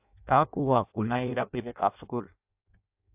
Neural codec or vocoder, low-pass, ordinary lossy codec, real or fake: codec, 16 kHz in and 24 kHz out, 0.6 kbps, FireRedTTS-2 codec; 3.6 kHz; AAC, 32 kbps; fake